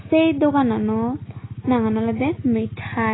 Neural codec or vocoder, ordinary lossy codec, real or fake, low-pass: none; AAC, 16 kbps; real; 7.2 kHz